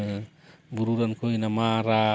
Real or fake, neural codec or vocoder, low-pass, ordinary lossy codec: real; none; none; none